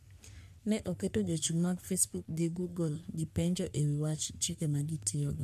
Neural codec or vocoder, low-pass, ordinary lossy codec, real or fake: codec, 44.1 kHz, 3.4 kbps, Pupu-Codec; 14.4 kHz; MP3, 96 kbps; fake